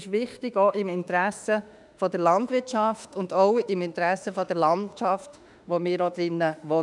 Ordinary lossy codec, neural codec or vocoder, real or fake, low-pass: none; autoencoder, 48 kHz, 32 numbers a frame, DAC-VAE, trained on Japanese speech; fake; 10.8 kHz